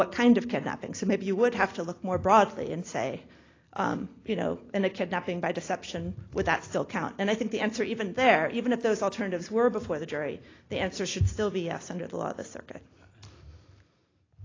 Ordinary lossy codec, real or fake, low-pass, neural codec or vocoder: AAC, 32 kbps; real; 7.2 kHz; none